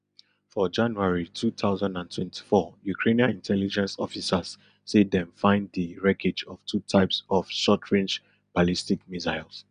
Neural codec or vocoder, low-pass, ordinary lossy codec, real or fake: none; 9.9 kHz; none; real